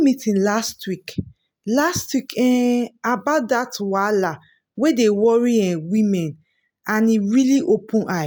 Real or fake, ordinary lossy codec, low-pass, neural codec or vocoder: real; none; none; none